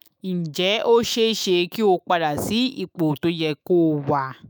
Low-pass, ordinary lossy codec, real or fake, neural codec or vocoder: none; none; fake; autoencoder, 48 kHz, 128 numbers a frame, DAC-VAE, trained on Japanese speech